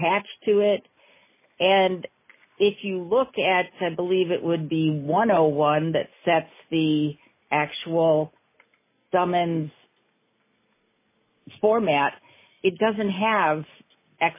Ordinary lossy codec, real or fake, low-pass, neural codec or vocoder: MP3, 16 kbps; real; 3.6 kHz; none